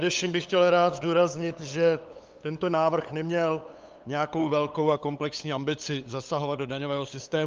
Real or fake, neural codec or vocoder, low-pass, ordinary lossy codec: fake; codec, 16 kHz, 8 kbps, FunCodec, trained on LibriTTS, 25 frames a second; 7.2 kHz; Opus, 24 kbps